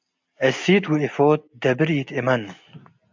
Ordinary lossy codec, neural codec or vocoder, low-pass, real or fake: MP3, 64 kbps; none; 7.2 kHz; real